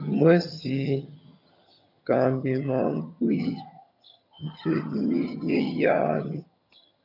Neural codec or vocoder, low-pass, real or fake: vocoder, 22.05 kHz, 80 mel bands, HiFi-GAN; 5.4 kHz; fake